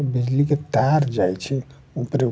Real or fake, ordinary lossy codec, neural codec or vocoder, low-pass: real; none; none; none